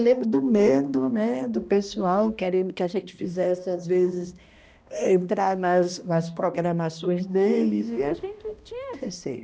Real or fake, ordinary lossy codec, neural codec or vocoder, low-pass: fake; none; codec, 16 kHz, 1 kbps, X-Codec, HuBERT features, trained on balanced general audio; none